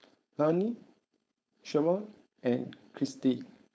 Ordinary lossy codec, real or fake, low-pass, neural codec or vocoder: none; fake; none; codec, 16 kHz, 4.8 kbps, FACodec